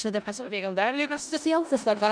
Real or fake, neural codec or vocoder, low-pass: fake; codec, 16 kHz in and 24 kHz out, 0.4 kbps, LongCat-Audio-Codec, four codebook decoder; 9.9 kHz